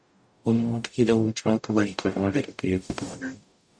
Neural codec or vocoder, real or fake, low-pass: codec, 44.1 kHz, 0.9 kbps, DAC; fake; 9.9 kHz